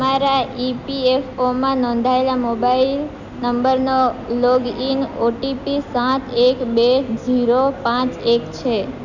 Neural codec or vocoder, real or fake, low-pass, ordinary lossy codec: none; real; 7.2 kHz; none